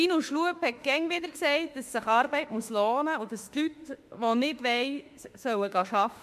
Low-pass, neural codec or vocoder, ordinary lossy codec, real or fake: 14.4 kHz; autoencoder, 48 kHz, 32 numbers a frame, DAC-VAE, trained on Japanese speech; MP3, 64 kbps; fake